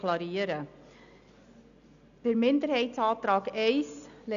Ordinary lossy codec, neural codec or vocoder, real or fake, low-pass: none; none; real; 7.2 kHz